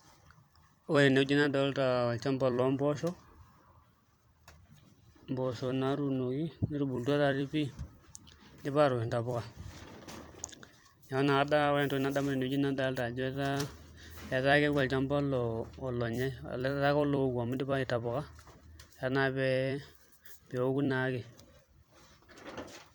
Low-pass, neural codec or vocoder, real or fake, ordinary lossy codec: none; vocoder, 44.1 kHz, 128 mel bands every 256 samples, BigVGAN v2; fake; none